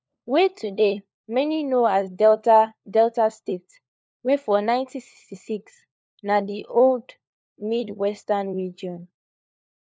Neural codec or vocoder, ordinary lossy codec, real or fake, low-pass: codec, 16 kHz, 4 kbps, FunCodec, trained on LibriTTS, 50 frames a second; none; fake; none